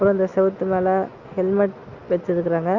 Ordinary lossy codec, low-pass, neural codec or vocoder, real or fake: Opus, 64 kbps; 7.2 kHz; none; real